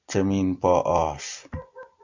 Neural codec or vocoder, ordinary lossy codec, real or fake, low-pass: none; AAC, 48 kbps; real; 7.2 kHz